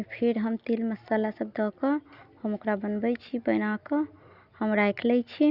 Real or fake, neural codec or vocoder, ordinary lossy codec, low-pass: real; none; Opus, 64 kbps; 5.4 kHz